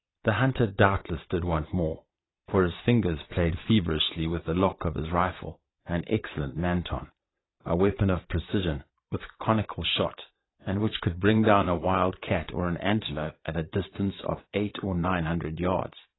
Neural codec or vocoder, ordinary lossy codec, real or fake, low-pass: vocoder, 22.05 kHz, 80 mel bands, Vocos; AAC, 16 kbps; fake; 7.2 kHz